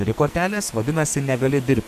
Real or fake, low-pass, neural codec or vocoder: fake; 14.4 kHz; codec, 44.1 kHz, 2.6 kbps, SNAC